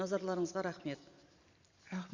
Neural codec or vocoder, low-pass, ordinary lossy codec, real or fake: none; 7.2 kHz; Opus, 64 kbps; real